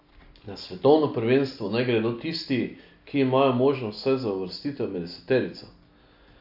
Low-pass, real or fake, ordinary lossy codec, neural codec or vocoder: 5.4 kHz; real; AAC, 48 kbps; none